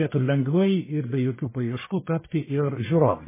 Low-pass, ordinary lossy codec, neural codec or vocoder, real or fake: 3.6 kHz; MP3, 16 kbps; codec, 44.1 kHz, 1.7 kbps, Pupu-Codec; fake